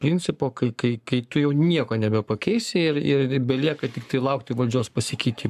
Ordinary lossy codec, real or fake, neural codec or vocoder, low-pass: AAC, 96 kbps; fake; codec, 44.1 kHz, 7.8 kbps, Pupu-Codec; 14.4 kHz